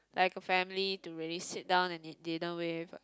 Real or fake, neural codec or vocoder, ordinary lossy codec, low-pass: real; none; none; none